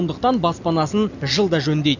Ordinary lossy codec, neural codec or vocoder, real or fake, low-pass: none; none; real; 7.2 kHz